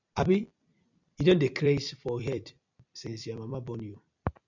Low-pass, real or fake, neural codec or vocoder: 7.2 kHz; fake; vocoder, 44.1 kHz, 128 mel bands every 256 samples, BigVGAN v2